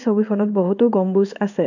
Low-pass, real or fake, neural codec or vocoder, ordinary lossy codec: 7.2 kHz; fake; codec, 16 kHz in and 24 kHz out, 1 kbps, XY-Tokenizer; none